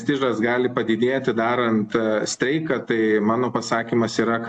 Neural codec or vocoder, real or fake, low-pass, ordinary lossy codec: none; real; 10.8 kHz; AAC, 64 kbps